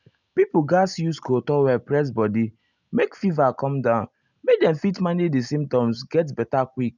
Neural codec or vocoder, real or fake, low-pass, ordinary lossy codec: none; real; 7.2 kHz; none